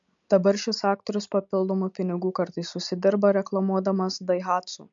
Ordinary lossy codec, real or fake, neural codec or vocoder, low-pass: MP3, 64 kbps; real; none; 7.2 kHz